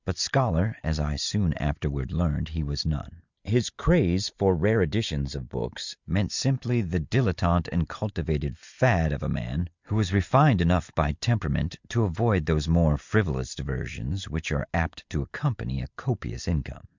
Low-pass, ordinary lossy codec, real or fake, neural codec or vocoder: 7.2 kHz; Opus, 64 kbps; real; none